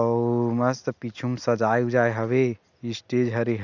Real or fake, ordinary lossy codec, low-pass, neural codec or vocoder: real; none; 7.2 kHz; none